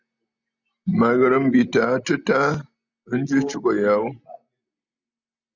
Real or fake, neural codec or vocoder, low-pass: real; none; 7.2 kHz